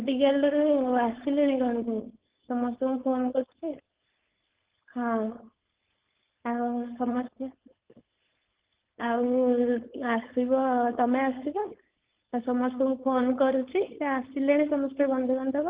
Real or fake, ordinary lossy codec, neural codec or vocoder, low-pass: fake; Opus, 16 kbps; codec, 16 kHz, 4.8 kbps, FACodec; 3.6 kHz